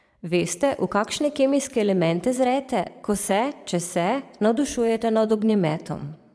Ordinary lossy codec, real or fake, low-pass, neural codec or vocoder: none; fake; none; vocoder, 22.05 kHz, 80 mel bands, Vocos